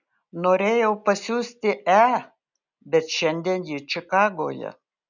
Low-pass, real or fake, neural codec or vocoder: 7.2 kHz; real; none